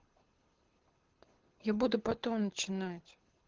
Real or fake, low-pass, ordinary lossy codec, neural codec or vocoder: fake; 7.2 kHz; Opus, 16 kbps; codec, 24 kHz, 6 kbps, HILCodec